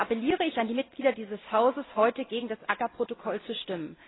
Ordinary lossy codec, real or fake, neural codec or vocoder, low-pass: AAC, 16 kbps; real; none; 7.2 kHz